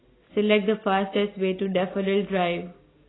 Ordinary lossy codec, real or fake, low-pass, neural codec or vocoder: AAC, 16 kbps; real; 7.2 kHz; none